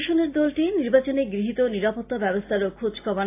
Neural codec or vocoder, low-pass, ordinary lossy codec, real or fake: none; 3.6 kHz; AAC, 24 kbps; real